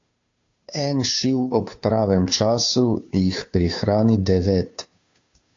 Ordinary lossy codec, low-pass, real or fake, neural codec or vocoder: AAC, 48 kbps; 7.2 kHz; fake; codec, 16 kHz, 2 kbps, FunCodec, trained on Chinese and English, 25 frames a second